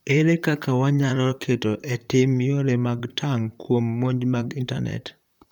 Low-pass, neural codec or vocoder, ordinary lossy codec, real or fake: 19.8 kHz; vocoder, 44.1 kHz, 128 mel bands, Pupu-Vocoder; none; fake